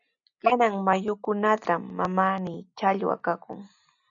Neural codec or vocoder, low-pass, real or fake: none; 7.2 kHz; real